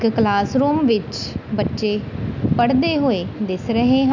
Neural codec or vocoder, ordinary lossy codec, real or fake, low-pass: none; none; real; 7.2 kHz